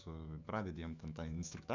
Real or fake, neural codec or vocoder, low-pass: fake; autoencoder, 48 kHz, 128 numbers a frame, DAC-VAE, trained on Japanese speech; 7.2 kHz